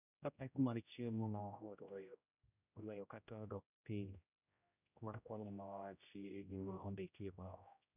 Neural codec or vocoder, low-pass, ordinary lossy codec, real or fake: codec, 16 kHz, 0.5 kbps, X-Codec, HuBERT features, trained on general audio; 3.6 kHz; none; fake